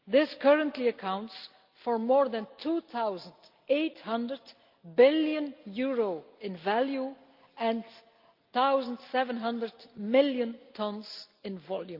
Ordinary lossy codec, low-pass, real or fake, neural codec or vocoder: Opus, 24 kbps; 5.4 kHz; real; none